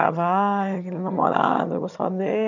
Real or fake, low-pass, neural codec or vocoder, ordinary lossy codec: fake; 7.2 kHz; vocoder, 22.05 kHz, 80 mel bands, HiFi-GAN; none